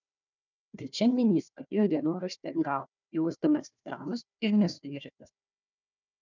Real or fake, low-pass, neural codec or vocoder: fake; 7.2 kHz; codec, 16 kHz, 1 kbps, FunCodec, trained on Chinese and English, 50 frames a second